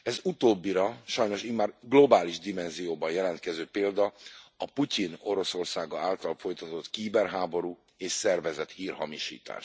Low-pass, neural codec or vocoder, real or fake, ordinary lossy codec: none; none; real; none